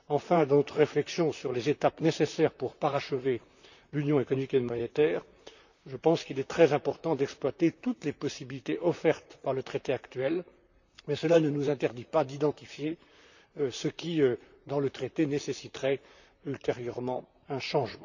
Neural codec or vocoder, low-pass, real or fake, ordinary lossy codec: vocoder, 44.1 kHz, 128 mel bands, Pupu-Vocoder; 7.2 kHz; fake; none